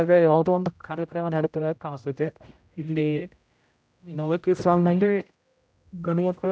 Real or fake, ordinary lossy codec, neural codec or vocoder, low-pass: fake; none; codec, 16 kHz, 0.5 kbps, X-Codec, HuBERT features, trained on general audio; none